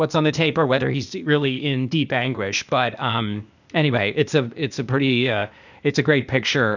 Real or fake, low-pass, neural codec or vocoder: fake; 7.2 kHz; codec, 16 kHz, 0.8 kbps, ZipCodec